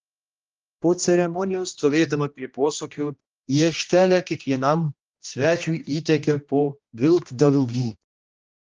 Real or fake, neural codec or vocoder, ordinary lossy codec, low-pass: fake; codec, 16 kHz, 1 kbps, X-Codec, HuBERT features, trained on general audio; Opus, 24 kbps; 7.2 kHz